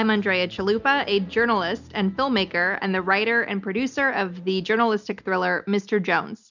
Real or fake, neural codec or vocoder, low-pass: real; none; 7.2 kHz